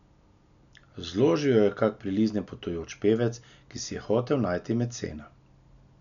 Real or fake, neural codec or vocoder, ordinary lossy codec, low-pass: real; none; none; 7.2 kHz